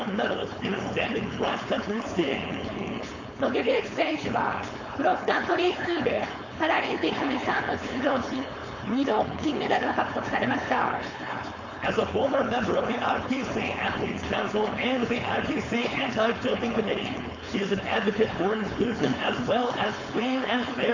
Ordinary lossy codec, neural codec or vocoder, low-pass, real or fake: AAC, 48 kbps; codec, 16 kHz, 4.8 kbps, FACodec; 7.2 kHz; fake